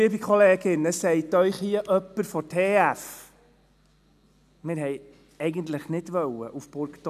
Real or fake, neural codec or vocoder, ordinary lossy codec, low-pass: real; none; none; 14.4 kHz